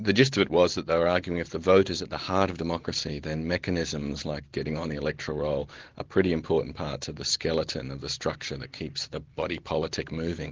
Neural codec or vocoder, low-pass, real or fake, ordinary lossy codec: none; 7.2 kHz; real; Opus, 16 kbps